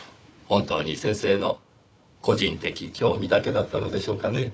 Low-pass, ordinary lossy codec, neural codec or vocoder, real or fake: none; none; codec, 16 kHz, 4 kbps, FunCodec, trained on Chinese and English, 50 frames a second; fake